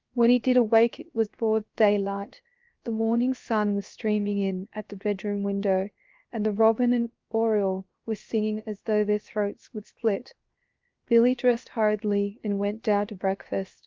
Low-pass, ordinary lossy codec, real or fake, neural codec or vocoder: 7.2 kHz; Opus, 32 kbps; fake; codec, 16 kHz, 0.7 kbps, FocalCodec